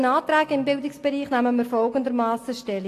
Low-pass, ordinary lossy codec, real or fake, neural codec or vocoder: 14.4 kHz; AAC, 48 kbps; real; none